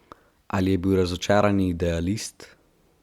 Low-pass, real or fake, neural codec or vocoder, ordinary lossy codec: 19.8 kHz; real; none; Opus, 64 kbps